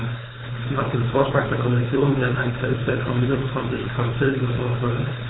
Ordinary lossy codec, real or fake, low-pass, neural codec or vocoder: AAC, 16 kbps; fake; 7.2 kHz; codec, 16 kHz, 4.8 kbps, FACodec